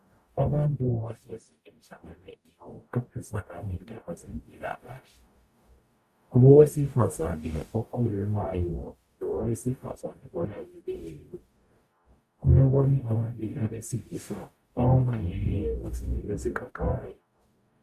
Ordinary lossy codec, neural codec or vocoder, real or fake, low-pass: Opus, 64 kbps; codec, 44.1 kHz, 0.9 kbps, DAC; fake; 14.4 kHz